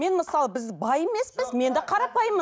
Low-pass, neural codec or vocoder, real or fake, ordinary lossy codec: none; none; real; none